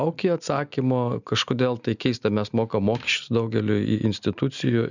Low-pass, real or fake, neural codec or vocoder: 7.2 kHz; real; none